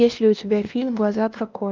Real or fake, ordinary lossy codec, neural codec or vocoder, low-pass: fake; Opus, 24 kbps; codec, 16 kHz, 1 kbps, X-Codec, WavLM features, trained on Multilingual LibriSpeech; 7.2 kHz